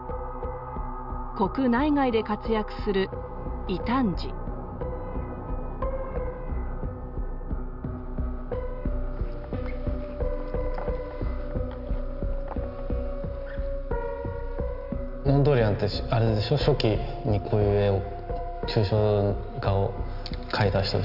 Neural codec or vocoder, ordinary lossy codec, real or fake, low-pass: none; none; real; 5.4 kHz